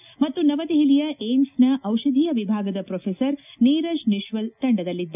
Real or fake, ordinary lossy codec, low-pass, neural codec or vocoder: real; none; 3.6 kHz; none